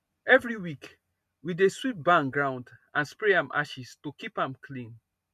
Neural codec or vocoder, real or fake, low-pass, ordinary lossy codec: none; real; 14.4 kHz; none